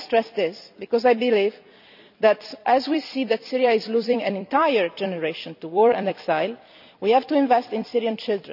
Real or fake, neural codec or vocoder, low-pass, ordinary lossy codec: fake; vocoder, 44.1 kHz, 128 mel bands every 256 samples, BigVGAN v2; 5.4 kHz; none